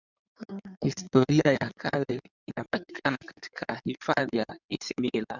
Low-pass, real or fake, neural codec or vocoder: 7.2 kHz; fake; codec, 16 kHz in and 24 kHz out, 2.2 kbps, FireRedTTS-2 codec